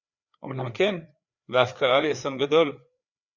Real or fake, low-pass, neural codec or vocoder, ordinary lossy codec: fake; 7.2 kHz; codec, 16 kHz, 4 kbps, FreqCodec, larger model; Opus, 64 kbps